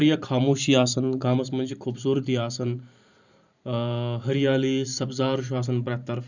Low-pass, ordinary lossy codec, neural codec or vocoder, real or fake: 7.2 kHz; none; vocoder, 44.1 kHz, 128 mel bands every 512 samples, BigVGAN v2; fake